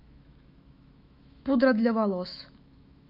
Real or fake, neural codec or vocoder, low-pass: fake; autoencoder, 48 kHz, 128 numbers a frame, DAC-VAE, trained on Japanese speech; 5.4 kHz